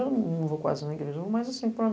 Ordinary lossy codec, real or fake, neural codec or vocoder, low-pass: none; real; none; none